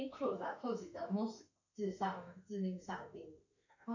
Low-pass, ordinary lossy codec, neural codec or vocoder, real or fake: 7.2 kHz; none; autoencoder, 48 kHz, 32 numbers a frame, DAC-VAE, trained on Japanese speech; fake